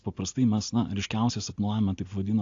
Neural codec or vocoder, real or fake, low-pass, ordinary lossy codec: none; real; 7.2 kHz; AAC, 48 kbps